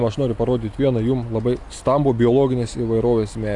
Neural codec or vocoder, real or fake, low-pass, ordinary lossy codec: none; real; 10.8 kHz; AAC, 64 kbps